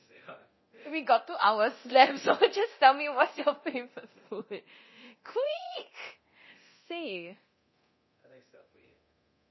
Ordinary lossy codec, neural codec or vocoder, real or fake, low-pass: MP3, 24 kbps; codec, 24 kHz, 0.9 kbps, DualCodec; fake; 7.2 kHz